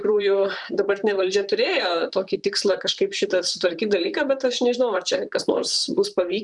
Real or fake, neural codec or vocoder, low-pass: fake; vocoder, 44.1 kHz, 128 mel bands, Pupu-Vocoder; 10.8 kHz